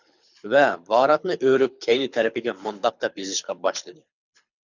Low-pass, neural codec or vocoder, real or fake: 7.2 kHz; codec, 24 kHz, 6 kbps, HILCodec; fake